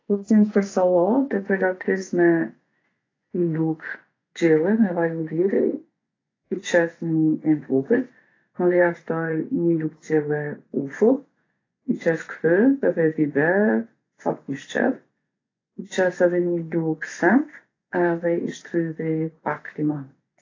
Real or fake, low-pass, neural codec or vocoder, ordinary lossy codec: fake; 7.2 kHz; vocoder, 24 kHz, 100 mel bands, Vocos; AAC, 32 kbps